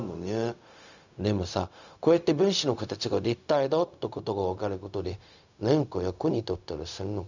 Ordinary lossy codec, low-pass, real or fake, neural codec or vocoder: none; 7.2 kHz; fake; codec, 16 kHz, 0.4 kbps, LongCat-Audio-Codec